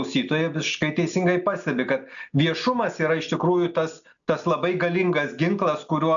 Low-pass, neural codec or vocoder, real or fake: 7.2 kHz; none; real